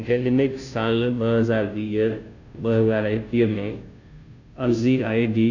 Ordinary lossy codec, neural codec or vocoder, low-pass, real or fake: none; codec, 16 kHz, 0.5 kbps, FunCodec, trained on Chinese and English, 25 frames a second; 7.2 kHz; fake